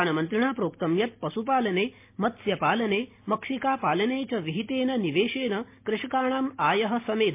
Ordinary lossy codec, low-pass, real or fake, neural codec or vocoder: MP3, 24 kbps; 3.6 kHz; real; none